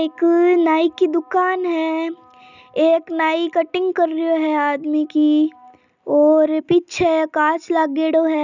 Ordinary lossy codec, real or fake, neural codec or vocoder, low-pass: none; real; none; 7.2 kHz